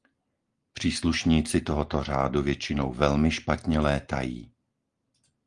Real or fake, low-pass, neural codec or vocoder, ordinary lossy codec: real; 9.9 kHz; none; Opus, 24 kbps